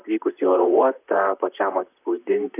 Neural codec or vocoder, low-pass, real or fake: vocoder, 44.1 kHz, 128 mel bands, Pupu-Vocoder; 3.6 kHz; fake